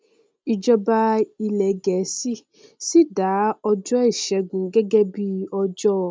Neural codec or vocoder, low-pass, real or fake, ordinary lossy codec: none; none; real; none